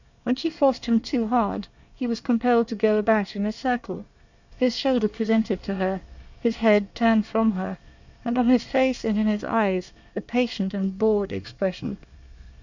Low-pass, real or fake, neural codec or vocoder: 7.2 kHz; fake; codec, 24 kHz, 1 kbps, SNAC